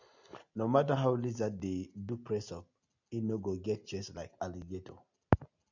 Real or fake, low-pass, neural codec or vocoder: real; 7.2 kHz; none